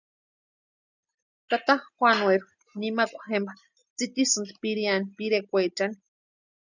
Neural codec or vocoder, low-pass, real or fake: none; 7.2 kHz; real